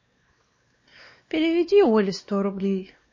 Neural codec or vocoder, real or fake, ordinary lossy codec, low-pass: codec, 16 kHz, 2 kbps, X-Codec, WavLM features, trained on Multilingual LibriSpeech; fake; MP3, 32 kbps; 7.2 kHz